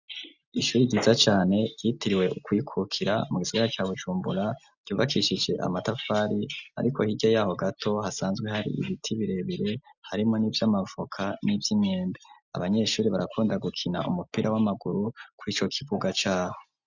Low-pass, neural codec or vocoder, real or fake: 7.2 kHz; none; real